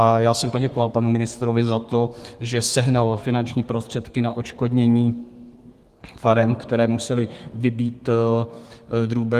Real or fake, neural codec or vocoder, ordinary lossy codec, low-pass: fake; codec, 32 kHz, 1.9 kbps, SNAC; Opus, 24 kbps; 14.4 kHz